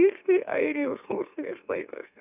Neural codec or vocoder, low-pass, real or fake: autoencoder, 44.1 kHz, a latent of 192 numbers a frame, MeloTTS; 3.6 kHz; fake